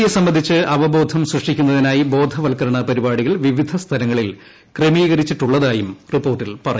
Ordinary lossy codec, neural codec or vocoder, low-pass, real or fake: none; none; none; real